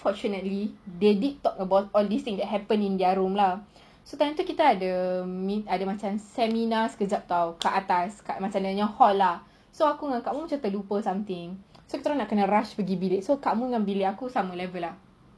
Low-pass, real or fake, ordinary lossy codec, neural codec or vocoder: none; real; none; none